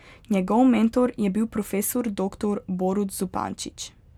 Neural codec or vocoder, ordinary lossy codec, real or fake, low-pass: none; none; real; 19.8 kHz